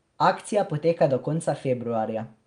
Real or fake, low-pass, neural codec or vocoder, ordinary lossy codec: real; 9.9 kHz; none; Opus, 32 kbps